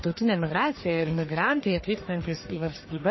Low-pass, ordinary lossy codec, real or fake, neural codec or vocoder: 7.2 kHz; MP3, 24 kbps; fake; codec, 44.1 kHz, 1.7 kbps, Pupu-Codec